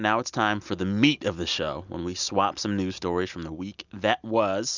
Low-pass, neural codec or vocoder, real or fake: 7.2 kHz; none; real